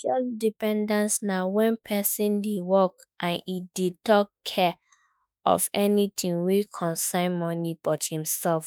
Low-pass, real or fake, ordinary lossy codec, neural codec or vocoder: none; fake; none; autoencoder, 48 kHz, 32 numbers a frame, DAC-VAE, trained on Japanese speech